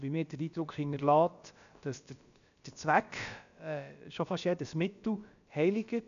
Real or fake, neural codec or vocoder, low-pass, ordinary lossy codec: fake; codec, 16 kHz, about 1 kbps, DyCAST, with the encoder's durations; 7.2 kHz; none